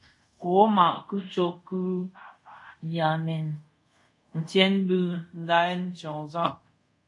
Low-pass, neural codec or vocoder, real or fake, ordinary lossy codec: 10.8 kHz; codec, 24 kHz, 0.5 kbps, DualCodec; fake; MP3, 64 kbps